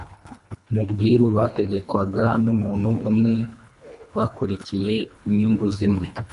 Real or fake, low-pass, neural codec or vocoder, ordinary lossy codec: fake; 10.8 kHz; codec, 24 kHz, 1.5 kbps, HILCodec; MP3, 64 kbps